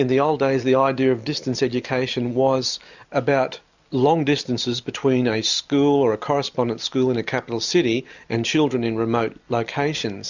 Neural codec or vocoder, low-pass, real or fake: none; 7.2 kHz; real